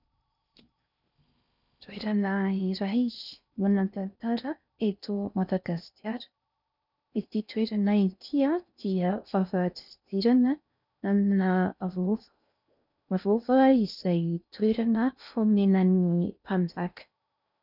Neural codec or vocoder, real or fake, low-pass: codec, 16 kHz in and 24 kHz out, 0.6 kbps, FocalCodec, streaming, 4096 codes; fake; 5.4 kHz